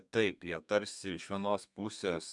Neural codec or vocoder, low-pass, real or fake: codec, 32 kHz, 1.9 kbps, SNAC; 10.8 kHz; fake